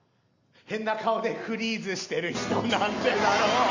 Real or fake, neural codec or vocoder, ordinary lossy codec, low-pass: real; none; Opus, 64 kbps; 7.2 kHz